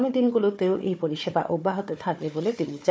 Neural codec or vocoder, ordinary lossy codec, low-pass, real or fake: codec, 16 kHz, 4 kbps, FunCodec, trained on Chinese and English, 50 frames a second; none; none; fake